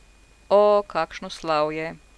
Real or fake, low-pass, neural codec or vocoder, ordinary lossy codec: real; none; none; none